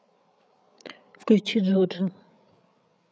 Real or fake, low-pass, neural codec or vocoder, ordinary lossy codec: fake; none; codec, 16 kHz, 8 kbps, FreqCodec, larger model; none